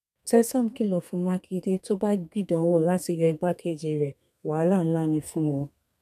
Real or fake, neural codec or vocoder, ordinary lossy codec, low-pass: fake; codec, 32 kHz, 1.9 kbps, SNAC; none; 14.4 kHz